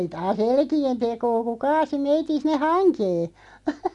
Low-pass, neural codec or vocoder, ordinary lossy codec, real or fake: 10.8 kHz; none; none; real